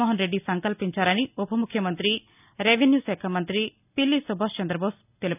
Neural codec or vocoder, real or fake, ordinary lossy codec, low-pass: none; real; none; 3.6 kHz